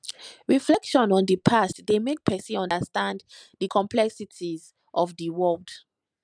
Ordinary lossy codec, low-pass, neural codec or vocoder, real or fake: none; 9.9 kHz; none; real